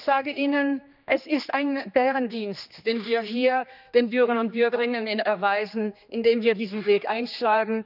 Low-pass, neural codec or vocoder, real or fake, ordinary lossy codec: 5.4 kHz; codec, 16 kHz, 2 kbps, X-Codec, HuBERT features, trained on general audio; fake; none